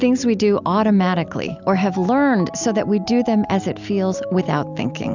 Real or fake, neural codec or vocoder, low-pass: real; none; 7.2 kHz